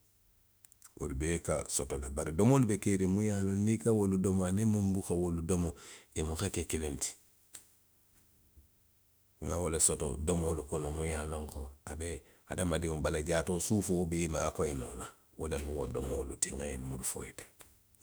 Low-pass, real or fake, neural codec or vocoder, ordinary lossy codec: none; fake; autoencoder, 48 kHz, 32 numbers a frame, DAC-VAE, trained on Japanese speech; none